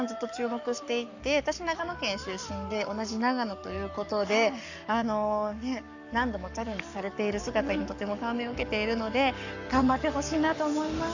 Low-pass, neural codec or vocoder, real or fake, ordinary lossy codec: 7.2 kHz; codec, 44.1 kHz, 7.8 kbps, Pupu-Codec; fake; none